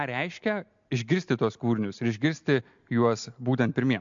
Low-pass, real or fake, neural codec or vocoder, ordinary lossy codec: 7.2 kHz; real; none; MP3, 64 kbps